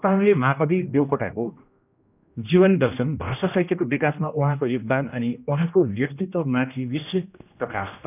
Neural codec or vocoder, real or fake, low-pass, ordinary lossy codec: codec, 16 kHz, 1 kbps, X-Codec, HuBERT features, trained on general audio; fake; 3.6 kHz; none